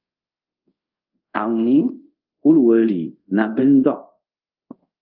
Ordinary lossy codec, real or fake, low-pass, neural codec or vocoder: Opus, 24 kbps; fake; 5.4 kHz; codec, 24 kHz, 0.5 kbps, DualCodec